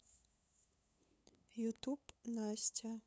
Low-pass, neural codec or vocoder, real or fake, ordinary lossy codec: none; codec, 16 kHz, 8 kbps, FunCodec, trained on LibriTTS, 25 frames a second; fake; none